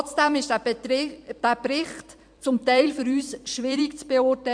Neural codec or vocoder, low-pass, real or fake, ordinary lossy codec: vocoder, 44.1 kHz, 128 mel bands every 256 samples, BigVGAN v2; 9.9 kHz; fake; MP3, 64 kbps